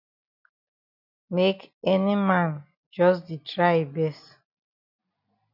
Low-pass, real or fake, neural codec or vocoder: 5.4 kHz; real; none